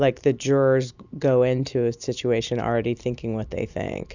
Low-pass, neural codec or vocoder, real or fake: 7.2 kHz; none; real